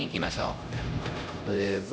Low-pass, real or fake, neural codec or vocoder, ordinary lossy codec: none; fake; codec, 16 kHz, 0.5 kbps, X-Codec, HuBERT features, trained on LibriSpeech; none